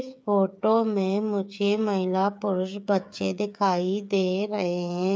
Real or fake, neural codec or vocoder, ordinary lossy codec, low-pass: fake; codec, 16 kHz, 8 kbps, FreqCodec, smaller model; none; none